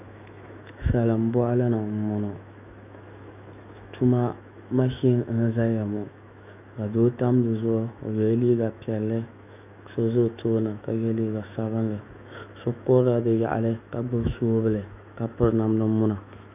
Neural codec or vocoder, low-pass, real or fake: autoencoder, 48 kHz, 128 numbers a frame, DAC-VAE, trained on Japanese speech; 3.6 kHz; fake